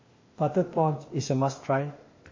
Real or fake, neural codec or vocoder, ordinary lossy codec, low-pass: fake; codec, 16 kHz, 0.8 kbps, ZipCodec; MP3, 32 kbps; 7.2 kHz